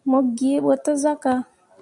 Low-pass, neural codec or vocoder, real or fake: 10.8 kHz; none; real